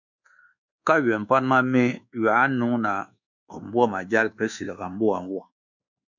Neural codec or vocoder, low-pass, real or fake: codec, 24 kHz, 1.2 kbps, DualCodec; 7.2 kHz; fake